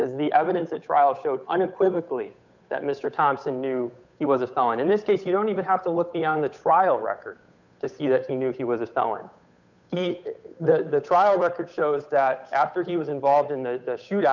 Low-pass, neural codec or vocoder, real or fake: 7.2 kHz; codec, 16 kHz, 8 kbps, FunCodec, trained on Chinese and English, 25 frames a second; fake